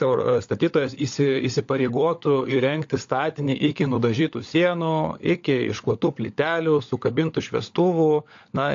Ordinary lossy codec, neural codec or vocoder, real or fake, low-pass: AAC, 48 kbps; codec, 16 kHz, 16 kbps, FunCodec, trained on LibriTTS, 50 frames a second; fake; 7.2 kHz